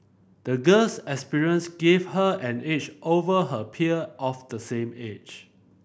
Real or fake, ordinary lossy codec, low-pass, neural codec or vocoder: real; none; none; none